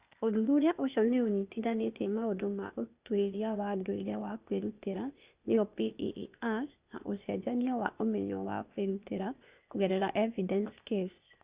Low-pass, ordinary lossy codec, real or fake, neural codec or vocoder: 3.6 kHz; Opus, 64 kbps; fake; codec, 16 kHz, 0.8 kbps, ZipCodec